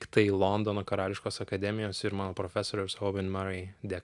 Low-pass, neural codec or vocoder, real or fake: 10.8 kHz; none; real